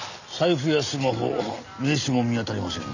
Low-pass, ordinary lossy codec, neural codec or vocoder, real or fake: 7.2 kHz; none; none; real